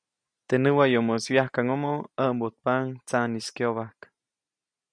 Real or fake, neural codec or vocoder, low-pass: real; none; 9.9 kHz